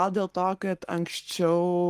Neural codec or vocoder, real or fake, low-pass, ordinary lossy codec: codec, 44.1 kHz, 7.8 kbps, Pupu-Codec; fake; 14.4 kHz; Opus, 24 kbps